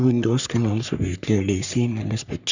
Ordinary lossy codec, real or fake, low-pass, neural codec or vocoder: none; fake; 7.2 kHz; codec, 44.1 kHz, 3.4 kbps, Pupu-Codec